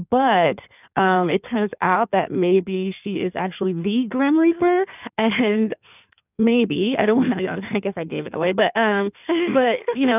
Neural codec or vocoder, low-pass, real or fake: codec, 16 kHz in and 24 kHz out, 1.1 kbps, FireRedTTS-2 codec; 3.6 kHz; fake